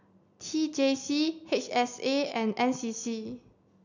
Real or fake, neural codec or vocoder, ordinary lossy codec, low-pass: real; none; none; 7.2 kHz